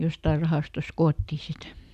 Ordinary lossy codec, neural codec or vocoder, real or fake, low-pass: none; none; real; 14.4 kHz